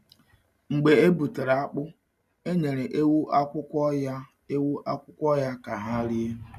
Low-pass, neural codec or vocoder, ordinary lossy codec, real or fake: 14.4 kHz; none; none; real